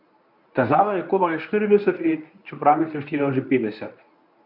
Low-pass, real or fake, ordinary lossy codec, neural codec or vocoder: 5.4 kHz; fake; none; codec, 24 kHz, 0.9 kbps, WavTokenizer, medium speech release version 1